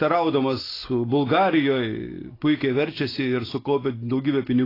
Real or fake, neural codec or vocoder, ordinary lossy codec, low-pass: real; none; AAC, 24 kbps; 5.4 kHz